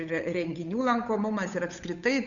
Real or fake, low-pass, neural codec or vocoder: fake; 7.2 kHz; codec, 16 kHz, 8 kbps, FunCodec, trained on Chinese and English, 25 frames a second